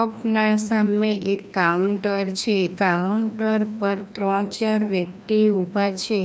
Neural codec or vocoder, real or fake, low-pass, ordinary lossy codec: codec, 16 kHz, 1 kbps, FreqCodec, larger model; fake; none; none